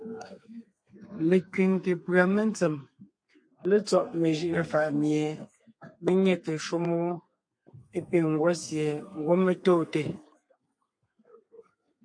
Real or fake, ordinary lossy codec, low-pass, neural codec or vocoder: fake; MP3, 48 kbps; 9.9 kHz; codec, 32 kHz, 1.9 kbps, SNAC